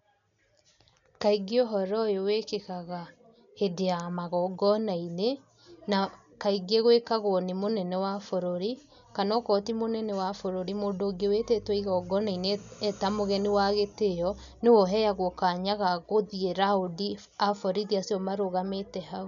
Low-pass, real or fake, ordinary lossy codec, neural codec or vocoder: 7.2 kHz; real; none; none